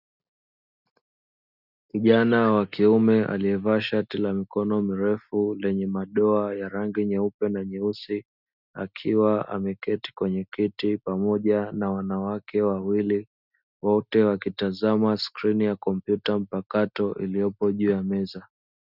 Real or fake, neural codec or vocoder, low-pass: real; none; 5.4 kHz